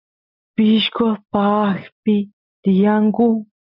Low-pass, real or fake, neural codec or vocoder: 5.4 kHz; real; none